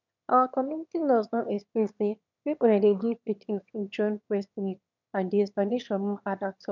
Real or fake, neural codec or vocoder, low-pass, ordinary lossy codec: fake; autoencoder, 22.05 kHz, a latent of 192 numbers a frame, VITS, trained on one speaker; 7.2 kHz; none